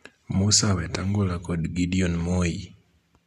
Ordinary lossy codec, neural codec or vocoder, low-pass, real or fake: none; none; 14.4 kHz; real